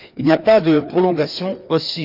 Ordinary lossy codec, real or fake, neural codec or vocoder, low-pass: none; fake; codec, 16 kHz, 2 kbps, FreqCodec, larger model; 5.4 kHz